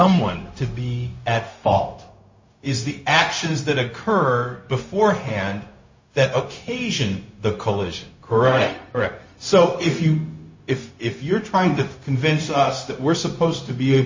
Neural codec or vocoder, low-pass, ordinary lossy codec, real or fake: codec, 16 kHz, 0.4 kbps, LongCat-Audio-Codec; 7.2 kHz; MP3, 32 kbps; fake